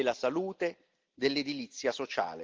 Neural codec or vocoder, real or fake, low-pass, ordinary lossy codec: none; real; 7.2 kHz; Opus, 32 kbps